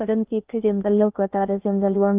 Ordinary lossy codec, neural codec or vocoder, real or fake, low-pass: Opus, 24 kbps; codec, 16 kHz in and 24 kHz out, 0.6 kbps, FocalCodec, streaming, 2048 codes; fake; 3.6 kHz